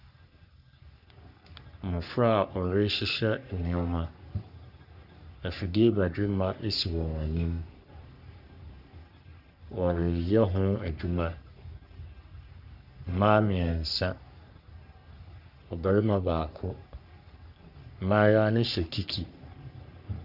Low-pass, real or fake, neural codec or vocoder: 5.4 kHz; fake; codec, 44.1 kHz, 3.4 kbps, Pupu-Codec